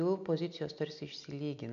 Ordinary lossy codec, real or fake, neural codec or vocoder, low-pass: MP3, 64 kbps; real; none; 7.2 kHz